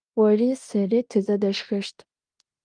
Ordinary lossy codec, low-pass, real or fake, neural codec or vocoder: Opus, 32 kbps; 9.9 kHz; fake; codec, 16 kHz in and 24 kHz out, 0.9 kbps, LongCat-Audio-Codec, fine tuned four codebook decoder